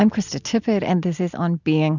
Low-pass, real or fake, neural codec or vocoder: 7.2 kHz; real; none